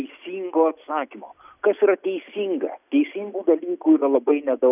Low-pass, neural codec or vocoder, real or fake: 3.6 kHz; none; real